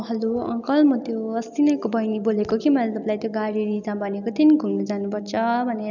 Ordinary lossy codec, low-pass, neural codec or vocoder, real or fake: none; 7.2 kHz; none; real